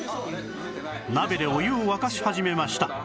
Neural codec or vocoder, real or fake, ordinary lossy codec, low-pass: none; real; none; none